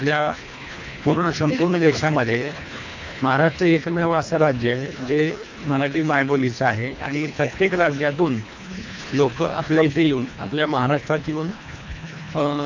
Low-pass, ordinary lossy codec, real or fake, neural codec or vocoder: 7.2 kHz; MP3, 48 kbps; fake; codec, 24 kHz, 1.5 kbps, HILCodec